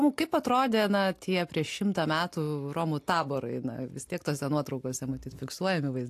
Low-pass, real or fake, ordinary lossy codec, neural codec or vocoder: 14.4 kHz; real; AAC, 64 kbps; none